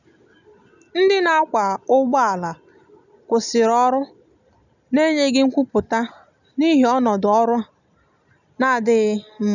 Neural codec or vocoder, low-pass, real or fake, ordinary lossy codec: none; 7.2 kHz; real; none